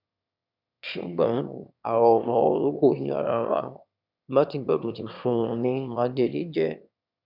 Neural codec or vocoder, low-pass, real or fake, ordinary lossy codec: autoencoder, 22.05 kHz, a latent of 192 numbers a frame, VITS, trained on one speaker; 5.4 kHz; fake; none